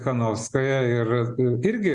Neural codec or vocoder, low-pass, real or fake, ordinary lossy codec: none; 10.8 kHz; real; Opus, 64 kbps